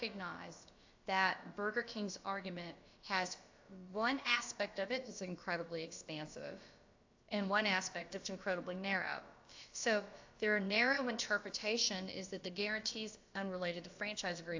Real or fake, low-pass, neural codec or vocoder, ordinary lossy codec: fake; 7.2 kHz; codec, 16 kHz, about 1 kbps, DyCAST, with the encoder's durations; AAC, 48 kbps